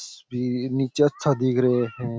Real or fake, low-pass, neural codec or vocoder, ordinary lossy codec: real; none; none; none